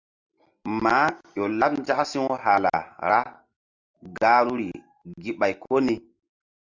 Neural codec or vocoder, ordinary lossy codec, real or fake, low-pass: none; Opus, 64 kbps; real; 7.2 kHz